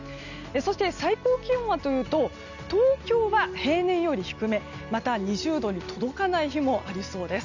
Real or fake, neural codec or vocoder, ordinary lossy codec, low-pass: real; none; none; 7.2 kHz